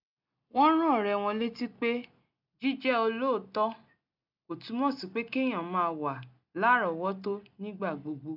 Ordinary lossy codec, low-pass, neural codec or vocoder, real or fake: none; 5.4 kHz; none; real